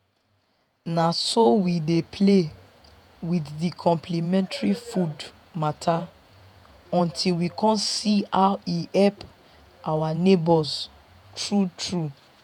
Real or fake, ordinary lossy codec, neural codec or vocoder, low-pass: fake; none; vocoder, 48 kHz, 128 mel bands, Vocos; 19.8 kHz